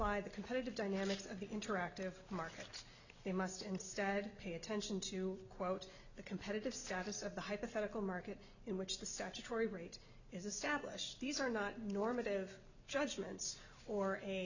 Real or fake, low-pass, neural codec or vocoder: real; 7.2 kHz; none